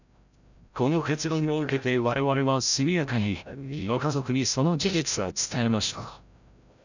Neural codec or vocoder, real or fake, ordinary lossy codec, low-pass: codec, 16 kHz, 0.5 kbps, FreqCodec, larger model; fake; none; 7.2 kHz